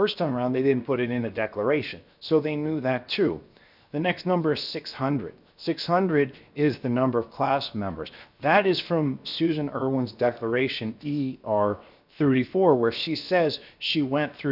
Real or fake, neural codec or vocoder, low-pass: fake; codec, 16 kHz, 0.7 kbps, FocalCodec; 5.4 kHz